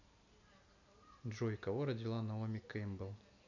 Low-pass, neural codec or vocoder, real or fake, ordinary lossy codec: 7.2 kHz; none; real; none